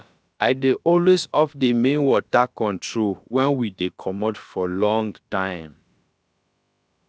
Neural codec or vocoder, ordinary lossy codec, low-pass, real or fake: codec, 16 kHz, about 1 kbps, DyCAST, with the encoder's durations; none; none; fake